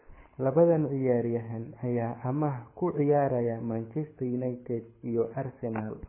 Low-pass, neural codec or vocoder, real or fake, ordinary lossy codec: 3.6 kHz; codec, 24 kHz, 6 kbps, HILCodec; fake; MP3, 16 kbps